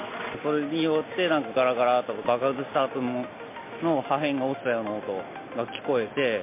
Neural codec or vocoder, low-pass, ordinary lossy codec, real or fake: none; 3.6 kHz; MP3, 24 kbps; real